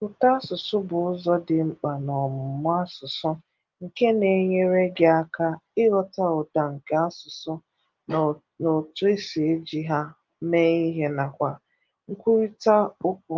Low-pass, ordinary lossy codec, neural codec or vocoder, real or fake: 7.2 kHz; Opus, 32 kbps; none; real